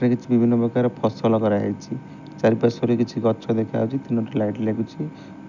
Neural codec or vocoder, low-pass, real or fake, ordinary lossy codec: none; 7.2 kHz; real; none